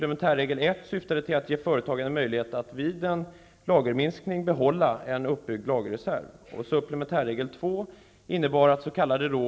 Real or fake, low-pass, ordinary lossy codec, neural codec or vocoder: real; none; none; none